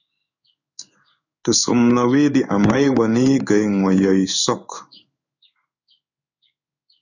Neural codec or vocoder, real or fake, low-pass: codec, 16 kHz in and 24 kHz out, 1 kbps, XY-Tokenizer; fake; 7.2 kHz